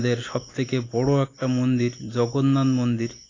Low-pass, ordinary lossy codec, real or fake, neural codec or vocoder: 7.2 kHz; AAC, 32 kbps; real; none